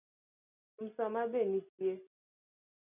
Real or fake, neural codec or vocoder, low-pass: real; none; 3.6 kHz